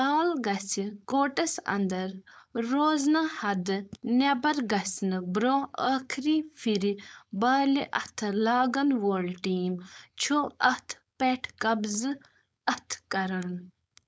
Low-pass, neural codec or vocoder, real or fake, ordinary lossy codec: none; codec, 16 kHz, 4.8 kbps, FACodec; fake; none